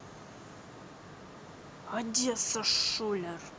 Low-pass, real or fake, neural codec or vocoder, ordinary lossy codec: none; real; none; none